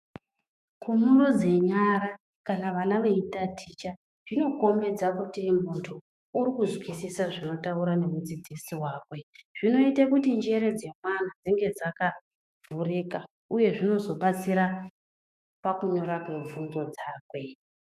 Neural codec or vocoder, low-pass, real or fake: autoencoder, 48 kHz, 128 numbers a frame, DAC-VAE, trained on Japanese speech; 14.4 kHz; fake